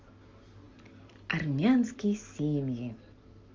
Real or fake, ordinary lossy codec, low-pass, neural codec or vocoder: real; Opus, 32 kbps; 7.2 kHz; none